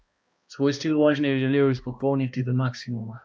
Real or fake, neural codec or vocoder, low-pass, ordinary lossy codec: fake; codec, 16 kHz, 1 kbps, X-Codec, HuBERT features, trained on balanced general audio; none; none